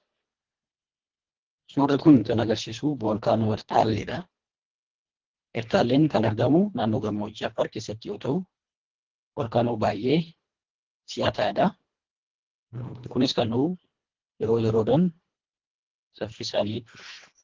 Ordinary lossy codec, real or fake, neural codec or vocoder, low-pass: Opus, 16 kbps; fake; codec, 24 kHz, 1.5 kbps, HILCodec; 7.2 kHz